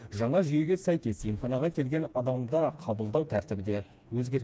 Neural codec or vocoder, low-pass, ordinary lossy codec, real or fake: codec, 16 kHz, 2 kbps, FreqCodec, smaller model; none; none; fake